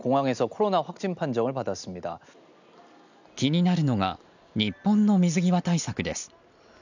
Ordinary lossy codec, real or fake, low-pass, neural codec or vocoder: none; real; 7.2 kHz; none